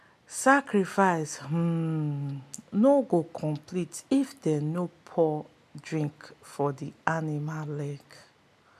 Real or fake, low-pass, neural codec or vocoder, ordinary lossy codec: real; 14.4 kHz; none; none